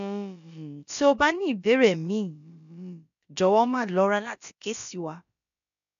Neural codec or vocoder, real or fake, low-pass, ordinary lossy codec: codec, 16 kHz, about 1 kbps, DyCAST, with the encoder's durations; fake; 7.2 kHz; AAC, 96 kbps